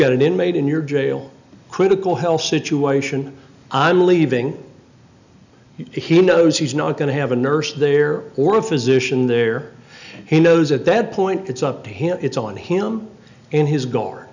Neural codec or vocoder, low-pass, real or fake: none; 7.2 kHz; real